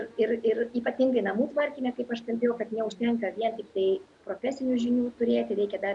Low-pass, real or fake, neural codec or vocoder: 10.8 kHz; real; none